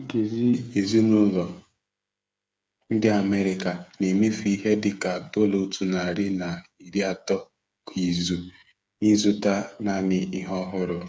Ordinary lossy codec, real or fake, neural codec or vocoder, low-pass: none; fake; codec, 16 kHz, 8 kbps, FreqCodec, smaller model; none